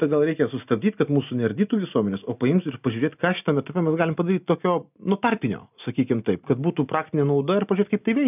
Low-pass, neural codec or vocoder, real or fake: 3.6 kHz; none; real